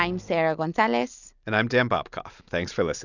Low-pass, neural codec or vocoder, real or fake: 7.2 kHz; none; real